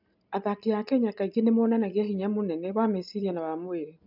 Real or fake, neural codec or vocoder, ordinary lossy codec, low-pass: real; none; none; 5.4 kHz